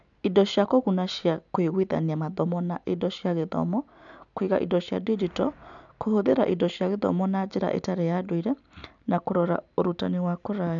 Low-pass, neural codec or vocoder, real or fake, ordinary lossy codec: 7.2 kHz; none; real; MP3, 96 kbps